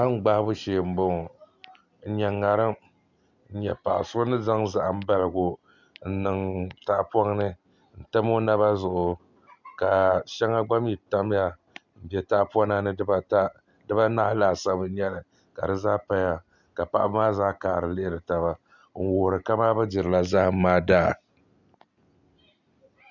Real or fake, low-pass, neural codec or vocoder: real; 7.2 kHz; none